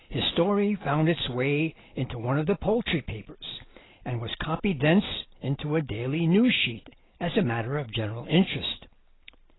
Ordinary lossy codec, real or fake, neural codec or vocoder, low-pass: AAC, 16 kbps; real; none; 7.2 kHz